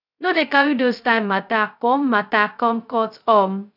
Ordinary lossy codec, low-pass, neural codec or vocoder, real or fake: none; 5.4 kHz; codec, 16 kHz, 0.2 kbps, FocalCodec; fake